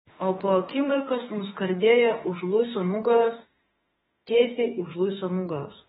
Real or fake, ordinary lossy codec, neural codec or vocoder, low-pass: fake; AAC, 16 kbps; autoencoder, 48 kHz, 32 numbers a frame, DAC-VAE, trained on Japanese speech; 19.8 kHz